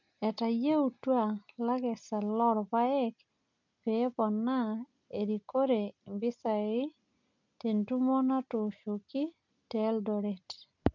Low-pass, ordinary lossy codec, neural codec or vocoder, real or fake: 7.2 kHz; none; none; real